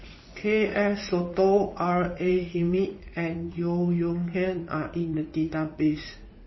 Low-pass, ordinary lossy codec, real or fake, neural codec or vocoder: 7.2 kHz; MP3, 24 kbps; fake; vocoder, 44.1 kHz, 80 mel bands, Vocos